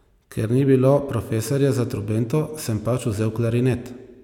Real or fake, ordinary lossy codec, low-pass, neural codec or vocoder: real; none; 19.8 kHz; none